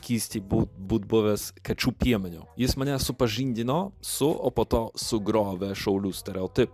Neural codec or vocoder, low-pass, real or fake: none; 14.4 kHz; real